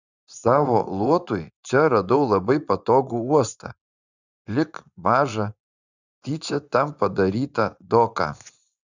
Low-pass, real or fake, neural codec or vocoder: 7.2 kHz; fake; vocoder, 24 kHz, 100 mel bands, Vocos